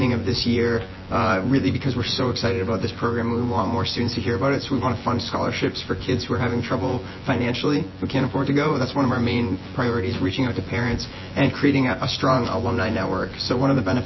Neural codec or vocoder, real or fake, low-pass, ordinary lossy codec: vocoder, 24 kHz, 100 mel bands, Vocos; fake; 7.2 kHz; MP3, 24 kbps